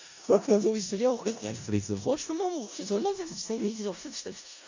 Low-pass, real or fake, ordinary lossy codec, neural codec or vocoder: 7.2 kHz; fake; AAC, 48 kbps; codec, 16 kHz in and 24 kHz out, 0.4 kbps, LongCat-Audio-Codec, four codebook decoder